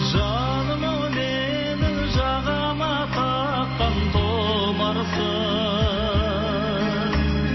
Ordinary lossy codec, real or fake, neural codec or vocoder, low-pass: MP3, 24 kbps; real; none; 7.2 kHz